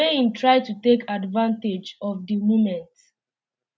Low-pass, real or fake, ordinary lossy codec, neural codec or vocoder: none; real; none; none